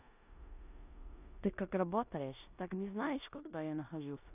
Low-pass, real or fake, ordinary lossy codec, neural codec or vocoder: 3.6 kHz; fake; none; codec, 16 kHz in and 24 kHz out, 0.9 kbps, LongCat-Audio-Codec, four codebook decoder